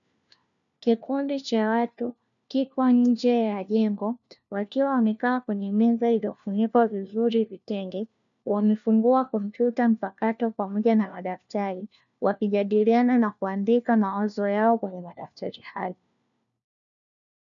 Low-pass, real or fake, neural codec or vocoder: 7.2 kHz; fake; codec, 16 kHz, 1 kbps, FunCodec, trained on LibriTTS, 50 frames a second